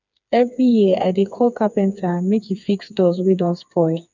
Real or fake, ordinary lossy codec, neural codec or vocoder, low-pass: fake; none; codec, 16 kHz, 4 kbps, FreqCodec, smaller model; 7.2 kHz